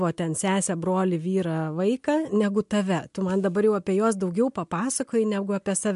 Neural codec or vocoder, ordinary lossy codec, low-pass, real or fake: none; MP3, 64 kbps; 10.8 kHz; real